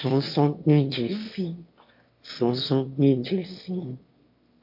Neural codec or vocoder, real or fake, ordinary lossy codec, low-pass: autoencoder, 22.05 kHz, a latent of 192 numbers a frame, VITS, trained on one speaker; fake; MP3, 32 kbps; 5.4 kHz